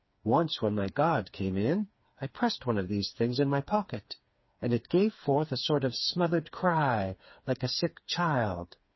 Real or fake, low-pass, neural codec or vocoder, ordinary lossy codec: fake; 7.2 kHz; codec, 16 kHz, 4 kbps, FreqCodec, smaller model; MP3, 24 kbps